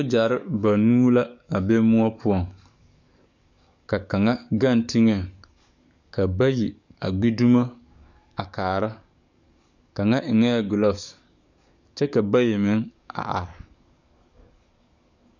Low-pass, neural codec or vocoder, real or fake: 7.2 kHz; codec, 44.1 kHz, 7.8 kbps, DAC; fake